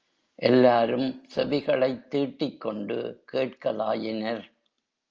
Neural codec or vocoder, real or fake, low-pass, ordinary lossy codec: none; real; 7.2 kHz; Opus, 24 kbps